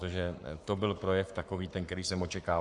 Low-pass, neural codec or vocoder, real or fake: 10.8 kHz; codec, 44.1 kHz, 7.8 kbps, Pupu-Codec; fake